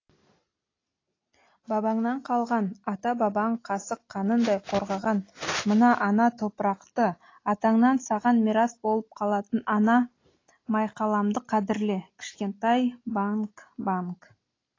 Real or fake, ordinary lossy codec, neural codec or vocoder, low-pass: real; AAC, 32 kbps; none; 7.2 kHz